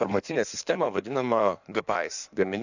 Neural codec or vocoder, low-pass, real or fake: codec, 16 kHz in and 24 kHz out, 1.1 kbps, FireRedTTS-2 codec; 7.2 kHz; fake